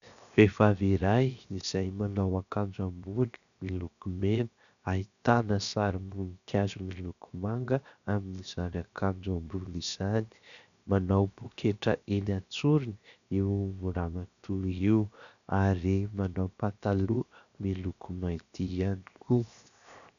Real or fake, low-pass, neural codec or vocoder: fake; 7.2 kHz; codec, 16 kHz, 0.7 kbps, FocalCodec